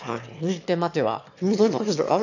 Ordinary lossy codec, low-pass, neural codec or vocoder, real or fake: none; 7.2 kHz; autoencoder, 22.05 kHz, a latent of 192 numbers a frame, VITS, trained on one speaker; fake